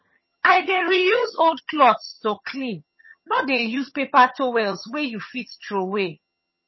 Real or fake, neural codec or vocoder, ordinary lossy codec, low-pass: fake; vocoder, 22.05 kHz, 80 mel bands, HiFi-GAN; MP3, 24 kbps; 7.2 kHz